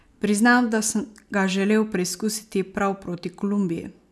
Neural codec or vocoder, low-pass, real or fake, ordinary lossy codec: none; none; real; none